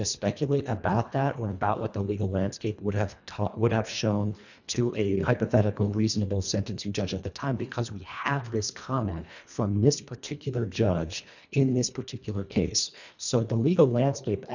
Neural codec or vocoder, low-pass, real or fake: codec, 24 kHz, 1.5 kbps, HILCodec; 7.2 kHz; fake